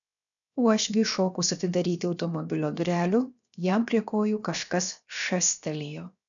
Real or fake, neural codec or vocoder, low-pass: fake; codec, 16 kHz, 0.7 kbps, FocalCodec; 7.2 kHz